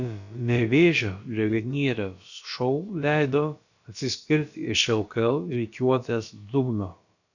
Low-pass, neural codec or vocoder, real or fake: 7.2 kHz; codec, 16 kHz, about 1 kbps, DyCAST, with the encoder's durations; fake